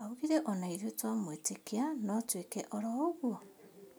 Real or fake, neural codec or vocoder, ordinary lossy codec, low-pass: real; none; none; none